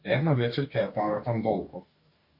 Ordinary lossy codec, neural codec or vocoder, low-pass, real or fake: MP3, 32 kbps; codec, 44.1 kHz, 2.6 kbps, DAC; 5.4 kHz; fake